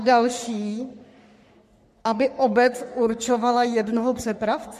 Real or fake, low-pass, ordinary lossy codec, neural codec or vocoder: fake; 14.4 kHz; MP3, 64 kbps; codec, 44.1 kHz, 3.4 kbps, Pupu-Codec